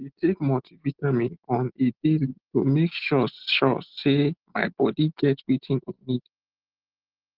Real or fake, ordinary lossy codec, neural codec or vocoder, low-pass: real; Opus, 16 kbps; none; 5.4 kHz